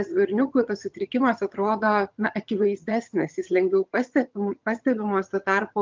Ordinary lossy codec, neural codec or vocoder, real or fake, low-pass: Opus, 24 kbps; vocoder, 22.05 kHz, 80 mel bands, HiFi-GAN; fake; 7.2 kHz